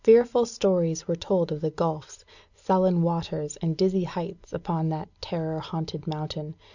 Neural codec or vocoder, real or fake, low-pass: none; real; 7.2 kHz